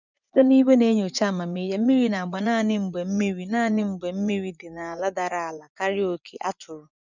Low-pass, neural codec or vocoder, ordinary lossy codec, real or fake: 7.2 kHz; vocoder, 24 kHz, 100 mel bands, Vocos; none; fake